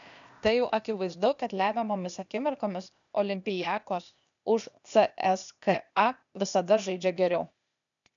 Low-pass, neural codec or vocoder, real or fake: 7.2 kHz; codec, 16 kHz, 0.8 kbps, ZipCodec; fake